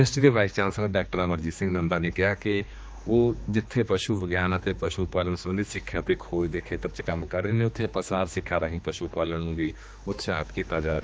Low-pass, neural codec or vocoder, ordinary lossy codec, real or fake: none; codec, 16 kHz, 2 kbps, X-Codec, HuBERT features, trained on general audio; none; fake